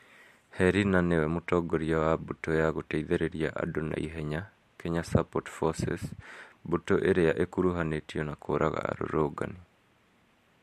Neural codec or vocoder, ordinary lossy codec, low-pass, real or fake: vocoder, 44.1 kHz, 128 mel bands every 512 samples, BigVGAN v2; MP3, 64 kbps; 14.4 kHz; fake